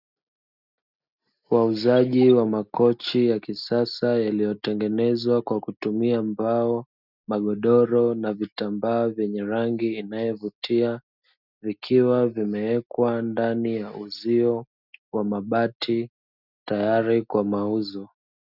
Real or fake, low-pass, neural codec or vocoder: real; 5.4 kHz; none